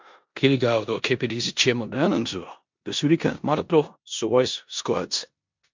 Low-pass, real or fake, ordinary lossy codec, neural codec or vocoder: 7.2 kHz; fake; MP3, 64 kbps; codec, 16 kHz in and 24 kHz out, 0.9 kbps, LongCat-Audio-Codec, four codebook decoder